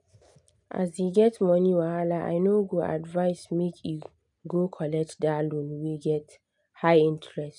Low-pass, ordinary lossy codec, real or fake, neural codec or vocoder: 10.8 kHz; none; real; none